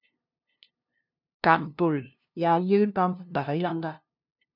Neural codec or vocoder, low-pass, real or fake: codec, 16 kHz, 0.5 kbps, FunCodec, trained on LibriTTS, 25 frames a second; 5.4 kHz; fake